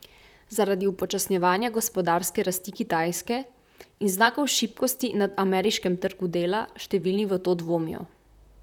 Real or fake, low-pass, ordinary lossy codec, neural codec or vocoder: fake; 19.8 kHz; none; vocoder, 44.1 kHz, 128 mel bands, Pupu-Vocoder